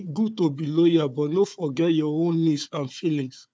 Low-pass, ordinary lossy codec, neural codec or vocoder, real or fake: none; none; codec, 16 kHz, 4 kbps, FunCodec, trained on Chinese and English, 50 frames a second; fake